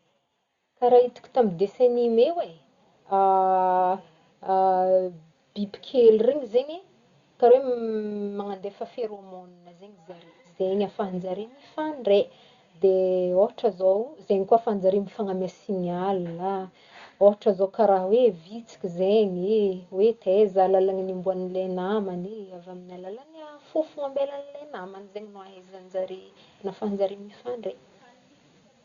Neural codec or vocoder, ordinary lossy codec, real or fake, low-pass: none; Opus, 64 kbps; real; 7.2 kHz